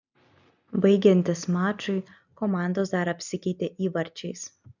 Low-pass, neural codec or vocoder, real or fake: 7.2 kHz; none; real